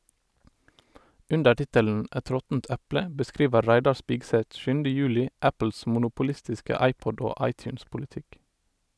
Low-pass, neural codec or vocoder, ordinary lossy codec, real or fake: none; none; none; real